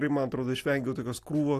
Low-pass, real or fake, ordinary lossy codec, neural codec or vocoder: 14.4 kHz; real; AAC, 64 kbps; none